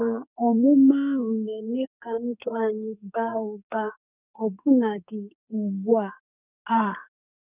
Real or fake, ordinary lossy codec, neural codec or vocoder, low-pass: fake; none; codec, 44.1 kHz, 2.6 kbps, SNAC; 3.6 kHz